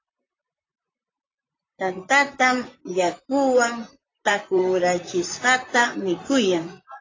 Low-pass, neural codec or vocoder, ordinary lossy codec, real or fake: 7.2 kHz; vocoder, 44.1 kHz, 128 mel bands, Pupu-Vocoder; AAC, 32 kbps; fake